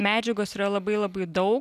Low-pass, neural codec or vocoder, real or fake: 14.4 kHz; none; real